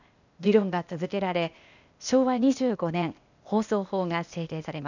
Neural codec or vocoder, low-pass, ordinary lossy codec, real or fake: codec, 16 kHz, 0.8 kbps, ZipCodec; 7.2 kHz; none; fake